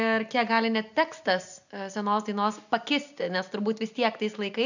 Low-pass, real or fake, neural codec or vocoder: 7.2 kHz; real; none